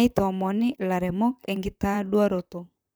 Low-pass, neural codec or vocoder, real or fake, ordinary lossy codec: none; vocoder, 44.1 kHz, 128 mel bands, Pupu-Vocoder; fake; none